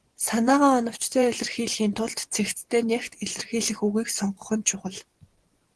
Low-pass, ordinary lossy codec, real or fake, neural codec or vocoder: 10.8 kHz; Opus, 16 kbps; fake; vocoder, 24 kHz, 100 mel bands, Vocos